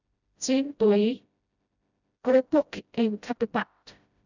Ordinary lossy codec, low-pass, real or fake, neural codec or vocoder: none; 7.2 kHz; fake; codec, 16 kHz, 0.5 kbps, FreqCodec, smaller model